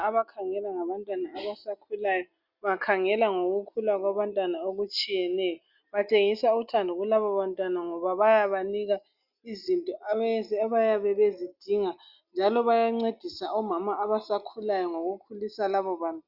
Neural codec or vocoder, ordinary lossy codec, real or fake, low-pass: none; Opus, 64 kbps; real; 5.4 kHz